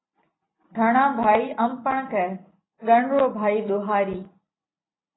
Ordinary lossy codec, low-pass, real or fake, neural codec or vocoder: AAC, 16 kbps; 7.2 kHz; real; none